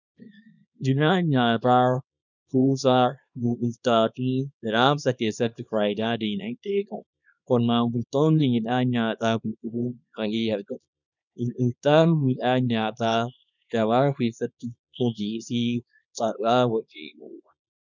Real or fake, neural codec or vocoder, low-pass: fake; codec, 24 kHz, 0.9 kbps, WavTokenizer, small release; 7.2 kHz